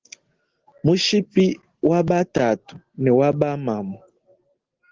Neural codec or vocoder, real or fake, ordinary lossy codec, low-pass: none; real; Opus, 16 kbps; 7.2 kHz